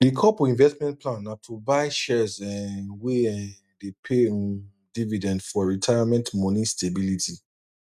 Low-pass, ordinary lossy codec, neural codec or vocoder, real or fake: 14.4 kHz; none; none; real